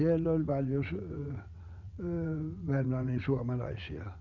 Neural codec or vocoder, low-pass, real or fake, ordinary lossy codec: vocoder, 22.05 kHz, 80 mel bands, WaveNeXt; 7.2 kHz; fake; none